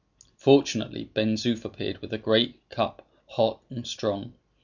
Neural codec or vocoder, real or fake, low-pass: none; real; 7.2 kHz